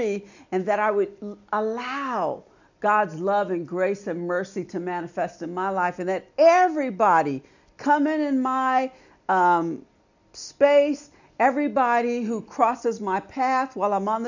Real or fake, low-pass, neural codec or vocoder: real; 7.2 kHz; none